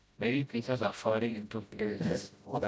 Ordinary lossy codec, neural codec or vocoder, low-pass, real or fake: none; codec, 16 kHz, 0.5 kbps, FreqCodec, smaller model; none; fake